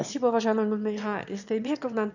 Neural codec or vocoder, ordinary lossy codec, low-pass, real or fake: autoencoder, 22.05 kHz, a latent of 192 numbers a frame, VITS, trained on one speaker; none; 7.2 kHz; fake